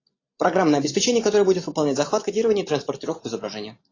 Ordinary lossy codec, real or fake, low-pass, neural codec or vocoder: AAC, 32 kbps; real; 7.2 kHz; none